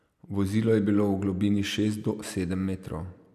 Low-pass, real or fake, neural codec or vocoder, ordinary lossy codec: 14.4 kHz; real; none; none